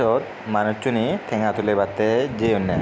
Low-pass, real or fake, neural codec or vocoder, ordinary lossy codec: none; real; none; none